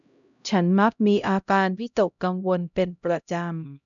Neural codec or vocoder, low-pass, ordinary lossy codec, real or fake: codec, 16 kHz, 0.5 kbps, X-Codec, HuBERT features, trained on LibriSpeech; 7.2 kHz; none; fake